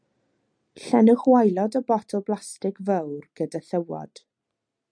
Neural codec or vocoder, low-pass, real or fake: none; 9.9 kHz; real